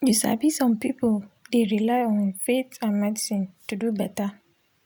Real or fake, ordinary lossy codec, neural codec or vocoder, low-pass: real; none; none; none